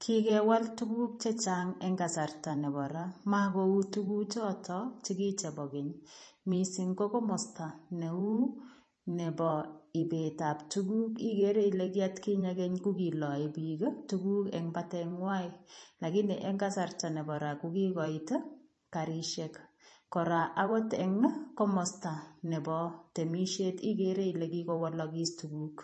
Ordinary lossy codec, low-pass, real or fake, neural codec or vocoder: MP3, 32 kbps; 10.8 kHz; fake; vocoder, 44.1 kHz, 128 mel bands every 512 samples, BigVGAN v2